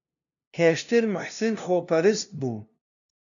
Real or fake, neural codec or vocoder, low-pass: fake; codec, 16 kHz, 0.5 kbps, FunCodec, trained on LibriTTS, 25 frames a second; 7.2 kHz